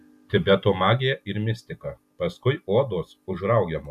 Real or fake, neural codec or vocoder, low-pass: real; none; 14.4 kHz